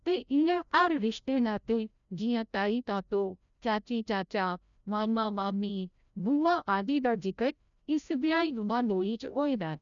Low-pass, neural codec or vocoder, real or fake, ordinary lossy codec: 7.2 kHz; codec, 16 kHz, 0.5 kbps, FreqCodec, larger model; fake; none